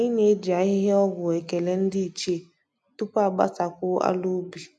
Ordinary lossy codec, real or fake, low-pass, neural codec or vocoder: none; real; none; none